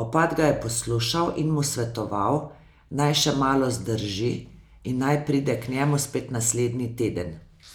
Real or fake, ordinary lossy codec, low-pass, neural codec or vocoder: real; none; none; none